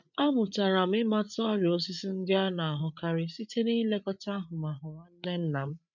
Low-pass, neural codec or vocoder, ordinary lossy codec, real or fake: 7.2 kHz; codec, 16 kHz, 8 kbps, FreqCodec, larger model; none; fake